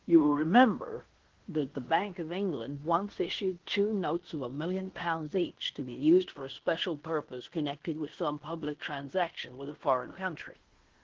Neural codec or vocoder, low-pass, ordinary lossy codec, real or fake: codec, 16 kHz in and 24 kHz out, 0.9 kbps, LongCat-Audio-Codec, fine tuned four codebook decoder; 7.2 kHz; Opus, 16 kbps; fake